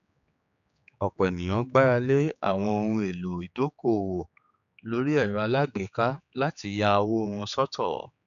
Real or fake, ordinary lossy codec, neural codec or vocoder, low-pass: fake; none; codec, 16 kHz, 4 kbps, X-Codec, HuBERT features, trained on general audio; 7.2 kHz